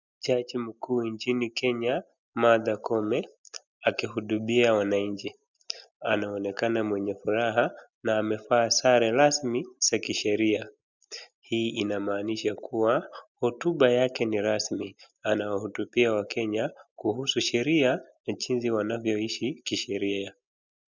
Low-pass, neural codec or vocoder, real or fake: 7.2 kHz; none; real